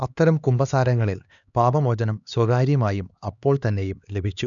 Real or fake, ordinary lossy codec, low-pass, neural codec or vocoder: fake; none; 7.2 kHz; codec, 16 kHz, 2 kbps, X-Codec, HuBERT features, trained on LibriSpeech